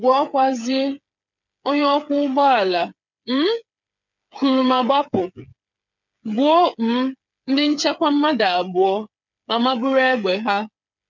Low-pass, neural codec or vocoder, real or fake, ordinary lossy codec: 7.2 kHz; codec, 16 kHz, 8 kbps, FreqCodec, smaller model; fake; none